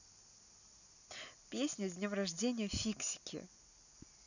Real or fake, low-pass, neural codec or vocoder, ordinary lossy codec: real; 7.2 kHz; none; none